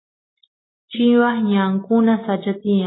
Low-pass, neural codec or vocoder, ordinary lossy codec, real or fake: 7.2 kHz; none; AAC, 16 kbps; real